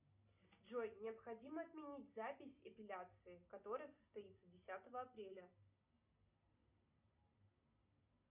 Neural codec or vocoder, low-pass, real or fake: none; 3.6 kHz; real